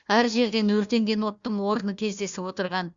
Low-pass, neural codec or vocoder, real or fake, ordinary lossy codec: 7.2 kHz; codec, 16 kHz, 1 kbps, FunCodec, trained on Chinese and English, 50 frames a second; fake; Opus, 64 kbps